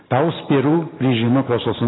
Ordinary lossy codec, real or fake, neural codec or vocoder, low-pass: AAC, 16 kbps; fake; vocoder, 44.1 kHz, 128 mel bands every 512 samples, BigVGAN v2; 7.2 kHz